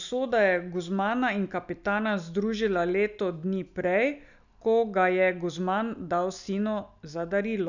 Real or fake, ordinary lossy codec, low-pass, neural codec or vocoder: real; none; 7.2 kHz; none